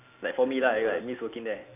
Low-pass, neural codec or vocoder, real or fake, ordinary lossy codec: 3.6 kHz; none; real; none